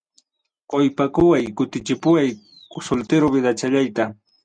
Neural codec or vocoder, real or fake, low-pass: none; real; 9.9 kHz